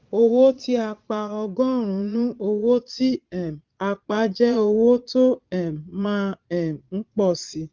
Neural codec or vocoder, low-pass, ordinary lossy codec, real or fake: vocoder, 22.05 kHz, 80 mel bands, Vocos; 7.2 kHz; Opus, 32 kbps; fake